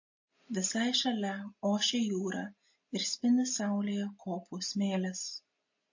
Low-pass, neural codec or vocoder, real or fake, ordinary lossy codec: 7.2 kHz; none; real; MP3, 32 kbps